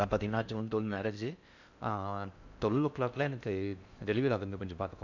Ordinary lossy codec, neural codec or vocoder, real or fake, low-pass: none; codec, 16 kHz in and 24 kHz out, 0.6 kbps, FocalCodec, streaming, 4096 codes; fake; 7.2 kHz